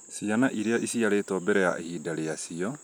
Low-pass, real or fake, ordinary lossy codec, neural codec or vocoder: none; real; none; none